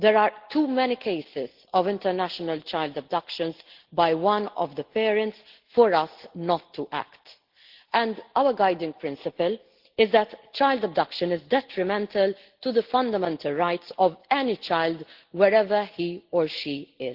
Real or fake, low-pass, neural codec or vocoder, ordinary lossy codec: real; 5.4 kHz; none; Opus, 16 kbps